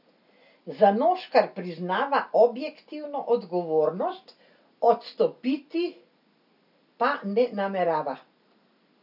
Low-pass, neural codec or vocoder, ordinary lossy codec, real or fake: 5.4 kHz; none; none; real